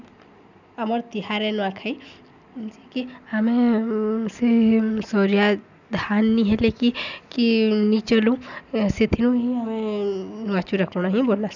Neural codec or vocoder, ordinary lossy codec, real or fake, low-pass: none; none; real; 7.2 kHz